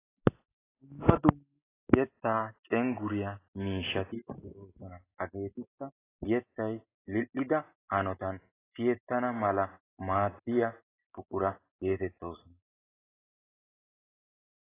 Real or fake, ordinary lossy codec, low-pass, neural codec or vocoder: real; AAC, 16 kbps; 3.6 kHz; none